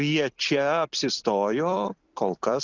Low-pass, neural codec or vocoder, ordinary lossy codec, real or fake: 7.2 kHz; vocoder, 44.1 kHz, 128 mel bands every 256 samples, BigVGAN v2; Opus, 64 kbps; fake